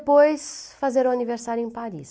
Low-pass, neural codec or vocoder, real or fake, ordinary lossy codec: none; none; real; none